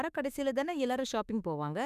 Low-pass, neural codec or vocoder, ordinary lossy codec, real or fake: 14.4 kHz; autoencoder, 48 kHz, 32 numbers a frame, DAC-VAE, trained on Japanese speech; none; fake